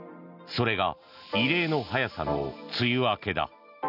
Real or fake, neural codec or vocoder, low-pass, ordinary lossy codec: real; none; 5.4 kHz; none